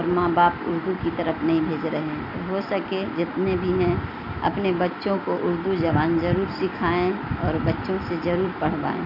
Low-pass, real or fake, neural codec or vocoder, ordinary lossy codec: 5.4 kHz; real; none; none